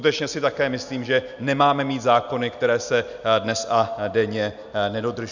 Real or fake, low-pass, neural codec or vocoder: real; 7.2 kHz; none